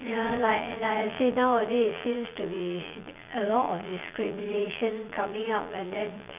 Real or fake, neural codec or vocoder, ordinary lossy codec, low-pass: fake; vocoder, 22.05 kHz, 80 mel bands, Vocos; none; 3.6 kHz